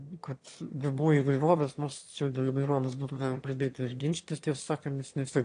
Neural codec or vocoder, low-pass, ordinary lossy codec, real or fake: autoencoder, 22.05 kHz, a latent of 192 numbers a frame, VITS, trained on one speaker; 9.9 kHz; AAC, 48 kbps; fake